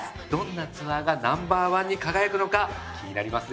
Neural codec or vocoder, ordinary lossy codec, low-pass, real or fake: none; none; none; real